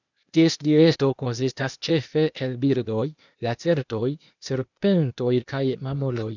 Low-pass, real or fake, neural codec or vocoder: 7.2 kHz; fake; codec, 16 kHz, 0.8 kbps, ZipCodec